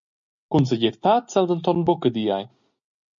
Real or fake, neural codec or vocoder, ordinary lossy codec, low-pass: real; none; MP3, 64 kbps; 7.2 kHz